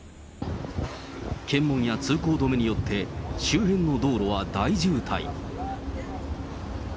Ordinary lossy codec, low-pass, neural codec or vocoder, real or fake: none; none; none; real